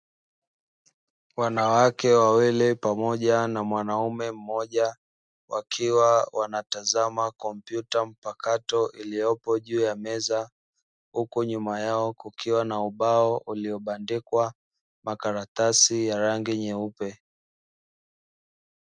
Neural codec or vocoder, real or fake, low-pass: none; real; 9.9 kHz